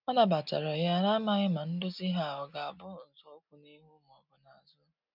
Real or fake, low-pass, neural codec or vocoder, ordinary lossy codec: real; 5.4 kHz; none; none